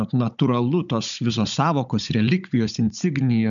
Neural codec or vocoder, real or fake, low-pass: codec, 16 kHz, 16 kbps, FunCodec, trained on Chinese and English, 50 frames a second; fake; 7.2 kHz